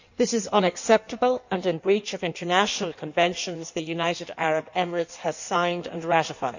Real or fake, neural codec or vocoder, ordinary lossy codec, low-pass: fake; codec, 16 kHz in and 24 kHz out, 1.1 kbps, FireRedTTS-2 codec; none; 7.2 kHz